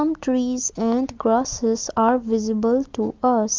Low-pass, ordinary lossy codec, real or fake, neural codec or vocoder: 7.2 kHz; Opus, 24 kbps; real; none